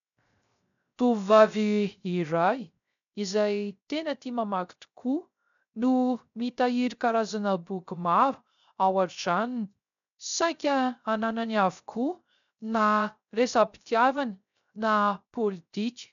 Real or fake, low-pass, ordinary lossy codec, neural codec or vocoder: fake; 7.2 kHz; MP3, 96 kbps; codec, 16 kHz, 0.3 kbps, FocalCodec